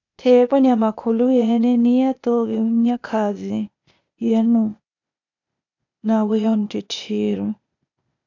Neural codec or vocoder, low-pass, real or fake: codec, 16 kHz, 0.8 kbps, ZipCodec; 7.2 kHz; fake